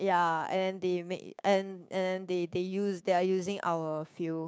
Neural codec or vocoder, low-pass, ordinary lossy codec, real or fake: codec, 16 kHz, 6 kbps, DAC; none; none; fake